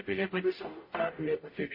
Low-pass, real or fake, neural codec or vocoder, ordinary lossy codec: 5.4 kHz; fake; codec, 44.1 kHz, 0.9 kbps, DAC; MP3, 24 kbps